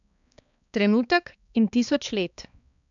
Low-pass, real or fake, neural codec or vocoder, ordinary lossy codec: 7.2 kHz; fake; codec, 16 kHz, 2 kbps, X-Codec, HuBERT features, trained on balanced general audio; none